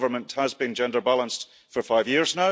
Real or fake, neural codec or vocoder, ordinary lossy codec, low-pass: real; none; none; none